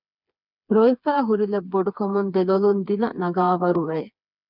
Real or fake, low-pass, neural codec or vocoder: fake; 5.4 kHz; codec, 16 kHz, 4 kbps, FreqCodec, smaller model